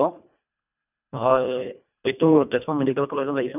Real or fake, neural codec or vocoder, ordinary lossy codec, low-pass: fake; codec, 24 kHz, 1.5 kbps, HILCodec; none; 3.6 kHz